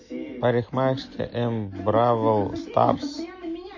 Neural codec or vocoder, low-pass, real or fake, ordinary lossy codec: none; 7.2 kHz; real; MP3, 32 kbps